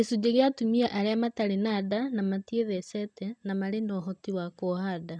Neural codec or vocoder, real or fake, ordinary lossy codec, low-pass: none; real; none; 9.9 kHz